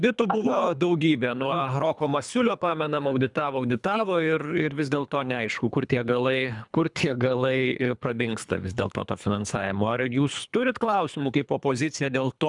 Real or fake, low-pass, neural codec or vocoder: fake; 10.8 kHz; codec, 24 kHz, 3 kbps, HILCodec